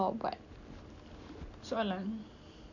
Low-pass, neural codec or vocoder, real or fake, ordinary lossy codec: 7.2 kHz; none; real; none